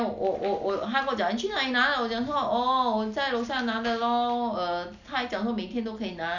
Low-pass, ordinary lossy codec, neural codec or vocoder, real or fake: 7.2 kHz; none; none; real